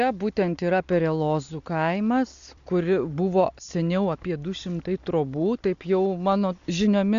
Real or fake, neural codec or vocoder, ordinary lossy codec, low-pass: real; none; AAC, 96 kbps; 7.2 kHz